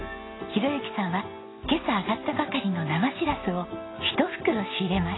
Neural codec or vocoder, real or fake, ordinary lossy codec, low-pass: none; real; AAC, 16 kbps; 7.2 kHz